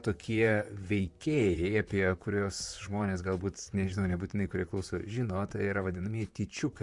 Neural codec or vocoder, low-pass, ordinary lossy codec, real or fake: vocoder, 44.1 kHz, 128 mel bands, Pupu-Vocoder; 10.8 kHz; MP3, 96 kbps; fake